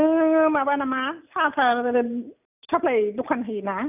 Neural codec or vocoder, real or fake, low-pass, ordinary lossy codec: none; real; 3.6 kHz; none